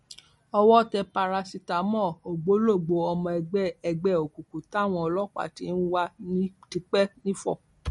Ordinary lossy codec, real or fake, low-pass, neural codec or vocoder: MP3, 48 kbps; real; 14.4 kHz; none